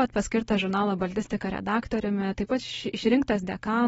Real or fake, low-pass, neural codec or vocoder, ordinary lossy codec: real; 19.8 kHz; none; AAC, 24 kbps